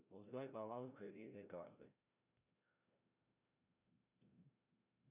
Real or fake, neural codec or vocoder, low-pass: fake; codec, 16 kHz, 0.5 kbps, FreqCodec, larger model; 3.6 kHz